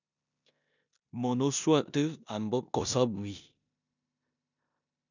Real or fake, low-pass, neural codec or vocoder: fake; 7.2 kHz; codec, 16 kHz in and 24 kHz out, 0.9 kbps, LongCat-Audio-Codec, four codebook decoder